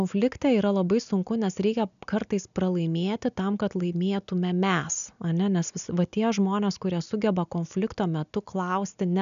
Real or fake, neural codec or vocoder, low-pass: real; none; 7.2 kHz